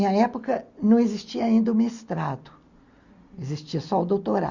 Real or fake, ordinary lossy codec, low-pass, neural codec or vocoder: real; Opus, 64 kbps; 7.2 kHz; none